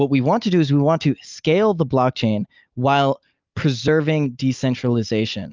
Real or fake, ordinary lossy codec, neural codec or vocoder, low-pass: real; Opus, 24 kbps; none; 7.2 kHz